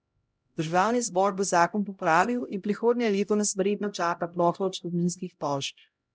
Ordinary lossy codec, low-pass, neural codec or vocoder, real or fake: none; none; codec, 16 kHz, 0.5 kbps, X-Codec, HuBERT features, trained on LibriSpeech; fake